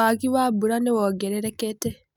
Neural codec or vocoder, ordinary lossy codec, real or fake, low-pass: none; none; real; 19.8 kHz